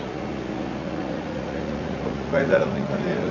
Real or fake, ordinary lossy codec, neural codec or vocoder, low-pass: fake; none; vocoder, 44.1 kHz, 80 mel bands, Vocos; 7.2 kHz